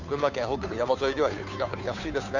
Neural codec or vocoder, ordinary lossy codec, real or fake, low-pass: codec, 16 kHz, 2 kbps, FunCodec, trained on Chinese and English, 25 frames a second; none; fake; 7.2 kHz